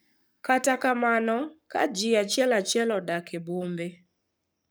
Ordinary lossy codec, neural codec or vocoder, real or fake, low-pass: none; codec, 44.1 kHz, 7.8 kbps, Pupu-Codec; fake; none